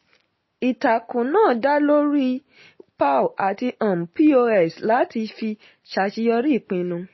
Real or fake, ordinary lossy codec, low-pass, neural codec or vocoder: real; MP3, 24 kbps; 7.2 kHz; none